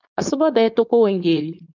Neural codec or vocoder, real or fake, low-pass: codec, 16 kHz, 4.8 kbps, FACodec; fake; 7.2 kHz